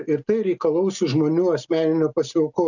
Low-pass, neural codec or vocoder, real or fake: 7.2 kHz; none; real